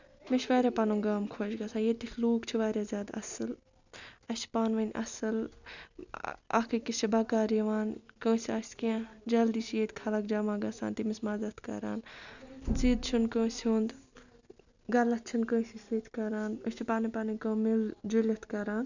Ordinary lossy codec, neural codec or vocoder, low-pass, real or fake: none; none; 7.2 kHz; real